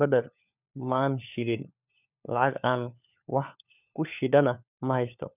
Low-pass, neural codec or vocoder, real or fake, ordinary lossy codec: 3.6 kHz; codec, 16 kHz, 4 kbps, FunCodec, trained on LibriTTS, 50 frames a second; fake; none